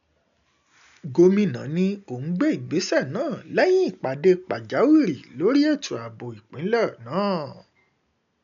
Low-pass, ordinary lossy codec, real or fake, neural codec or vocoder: 7.2 kHz; none; real; none